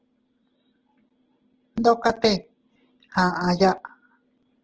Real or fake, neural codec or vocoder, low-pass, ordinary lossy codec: real; none; 7.2 kHz; Opus, 16 kbps